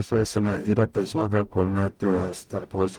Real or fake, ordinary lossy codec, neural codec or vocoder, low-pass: fake; Opus, 32 kbps; codec, 44.1 kHz, 0.9 kbps, DAC; 19.8 kHz